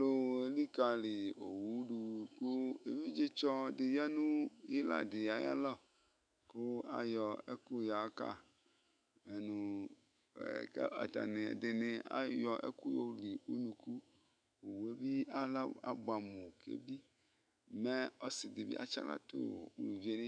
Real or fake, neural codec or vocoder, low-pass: fake; autoencoder, 48 kHz, 128 numbers a frame, DAC-VAE, trained on Japanese speech; 9.9 kHz